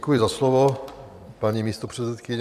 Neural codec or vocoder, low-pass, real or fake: vocoder, 44.1 kHz, 128 mel bands every 256 samples, BigVGAN v2; 14.4 kHz; fake